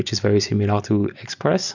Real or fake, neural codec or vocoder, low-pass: fake; vocoder, 44.1 kHz, 128 mel bands every 256 samples, BigVGAN v2; 7.2 kHz